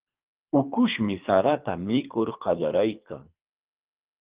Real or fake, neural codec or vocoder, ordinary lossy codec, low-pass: fake; codec, 24 kHz, 3 kbps, HILCodec; Opus, 24 kbps; 3.6 kHz